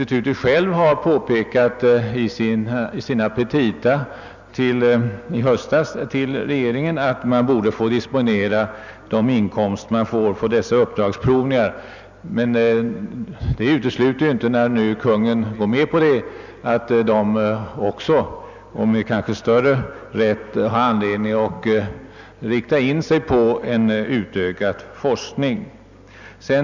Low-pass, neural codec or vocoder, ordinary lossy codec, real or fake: 7.2 kHz; none; none; real